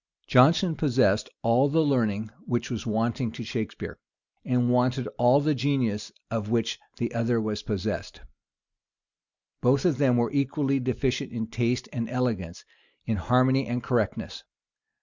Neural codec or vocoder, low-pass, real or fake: none; 7.2 kHz; real